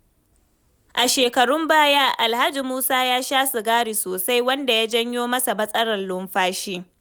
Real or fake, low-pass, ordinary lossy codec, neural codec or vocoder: real; none; none; none